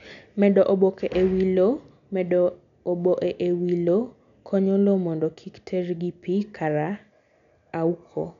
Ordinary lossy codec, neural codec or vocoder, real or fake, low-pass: none; none; real; 7.2 kHz